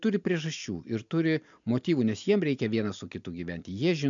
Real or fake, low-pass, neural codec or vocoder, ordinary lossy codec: real; 7.2 kHz; none; MP3, 64 kbps